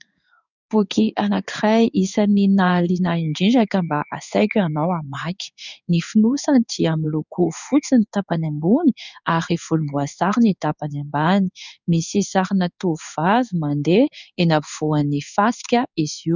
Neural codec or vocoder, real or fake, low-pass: codec, 16 kHz in and 24 kHz out, 1 kbps, XY-Tokenizer; fake; 7.2 kHz